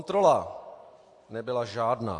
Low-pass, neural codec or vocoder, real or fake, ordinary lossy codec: 10.8 kHz; none; real; AAC, 48 kbps